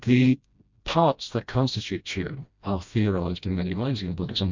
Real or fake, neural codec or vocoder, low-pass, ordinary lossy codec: fake; codec, 16 kHz, 1 kbps, FreqCodec, smaller model; 7.2 kHz; MP3, 64 kbps